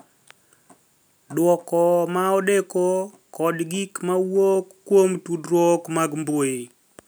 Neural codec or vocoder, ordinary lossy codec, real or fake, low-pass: none; none; real; none